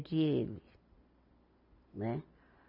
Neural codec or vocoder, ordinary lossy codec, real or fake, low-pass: none; none; real; 5.4 kHz